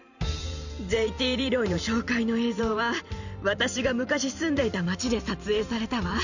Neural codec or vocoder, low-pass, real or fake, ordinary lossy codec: vocoder, 44.1 kHz, 128 mel bands every 512 samples, BigVGAN v2; 7.2 kHz; fake; none